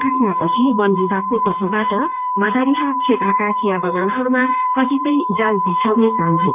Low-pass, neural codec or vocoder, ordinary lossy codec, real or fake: 3.6 kHz; codec, 16 kHz, 2 kbps, X-Codec, HuBERT features, trained on general audio; none; fake